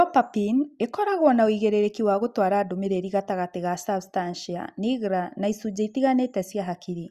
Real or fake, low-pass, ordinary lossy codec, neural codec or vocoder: real; 14.4 kHz; Opus, 64 kbps; none